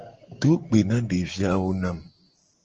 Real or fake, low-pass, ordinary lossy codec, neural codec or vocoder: real; 7.2 kHz; Opus, 16 kbps; none